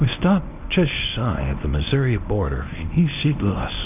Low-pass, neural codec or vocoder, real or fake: 3.6 kHz; codec, 24 kHz, 0.9 kbps, WavTokenizer, medium speech release version 1; fake